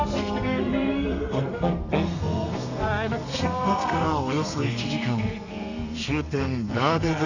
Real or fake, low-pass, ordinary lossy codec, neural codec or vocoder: fake; 7.2 kHz; none; codec, 32 kHz, 1.9 kbps, SNAC